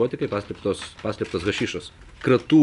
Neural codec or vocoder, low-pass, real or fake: none; 10.8 kHz; real